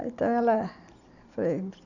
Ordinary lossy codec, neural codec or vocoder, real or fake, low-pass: none; none; real; 7.2 kHz